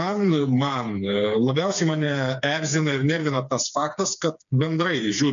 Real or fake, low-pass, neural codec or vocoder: fake; 7.2 kHz; codec, 16 kHz, 4 kbps, FreqCodec, smaller model